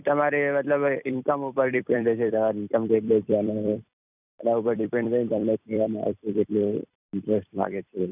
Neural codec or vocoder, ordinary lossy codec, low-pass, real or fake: vocoder, 44.1 kHz, 128 mel bands every 256 samples, BigVGAN v2; none; 3.6 kHz; fake